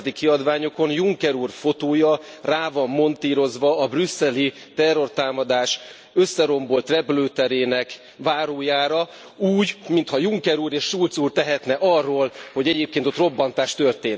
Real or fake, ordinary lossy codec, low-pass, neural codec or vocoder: real; none; none; none